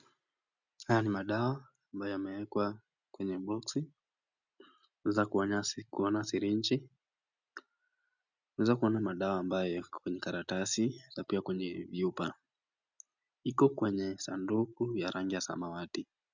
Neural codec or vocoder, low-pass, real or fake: none; 7.2 kHz; real